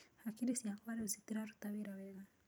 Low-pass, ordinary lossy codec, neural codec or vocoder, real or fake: none; none; vocoder, 44.1 kHz, 128 mel bands every 256 samples, BigVGAN v2; fake